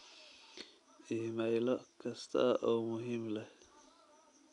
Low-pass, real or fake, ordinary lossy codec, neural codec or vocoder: 10.8 kHz; real; none; none